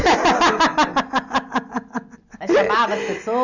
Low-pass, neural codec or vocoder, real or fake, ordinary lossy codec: 7.2 kHz; none; real; none